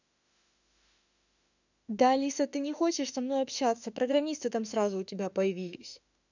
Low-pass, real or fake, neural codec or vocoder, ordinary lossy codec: 7.2 kHz; fake; autoencoder, 48 kHz, 32 numbers a frame, DAC-VAE, trained on Japanese speech; none